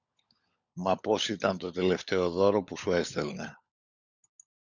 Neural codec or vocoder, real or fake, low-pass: codec, 16 kHz, 16 kbps, FunCodec, trained on LibriTTS, 50 frames a second; fake; 7.2 kHz